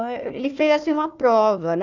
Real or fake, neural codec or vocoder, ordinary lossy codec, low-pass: fake; codec, 16 kHz, 1 kbps, FunCodec, trained on Chinese and English, 50 frames a second; none; 7.2 kHz